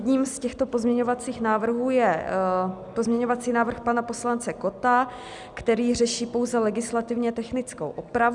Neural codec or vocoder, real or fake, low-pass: none; real; 10.8 kHz